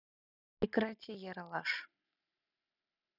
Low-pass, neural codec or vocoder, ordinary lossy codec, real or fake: 5.4 kHz; none; none; real